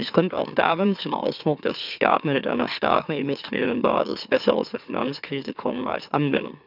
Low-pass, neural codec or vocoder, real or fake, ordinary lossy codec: 5.4 kHz; autoencoder, 44.1 kHz, a latent of 192 numbers a frame, MeloTTS; fake; none